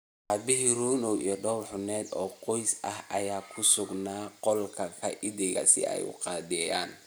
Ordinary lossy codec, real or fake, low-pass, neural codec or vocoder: none; real; none; none